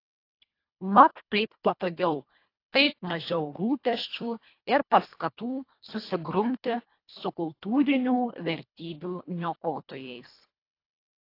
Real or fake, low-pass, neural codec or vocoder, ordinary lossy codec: fake; 5.4 kHz; codec, 24 kHz, 1.5 kbps, HILCodec; AAC, 32 kbps